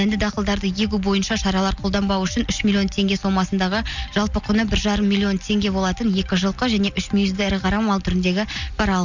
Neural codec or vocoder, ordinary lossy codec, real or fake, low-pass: none; none; real; 7.2 kHz